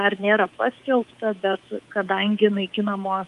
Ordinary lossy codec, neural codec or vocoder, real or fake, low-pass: Opus, 32 kbps; codec, 24 kHz, 3.1 kbps, DualCodec; fake; 10.8 kHz